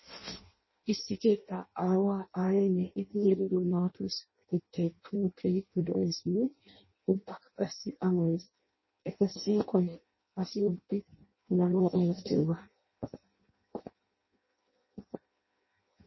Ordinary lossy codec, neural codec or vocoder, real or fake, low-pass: MP3, 24 kbps; codec, 16 kHz in and 24 kHz out, 0.6 kbps, FireRedTTS-2 codec; fake; 7.2 kHz